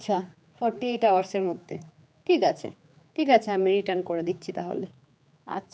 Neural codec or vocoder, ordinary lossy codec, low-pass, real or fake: codec, 16 kHz, 4 kbps, X-Codec, HuBERT features, trained on general audio; none; none; fake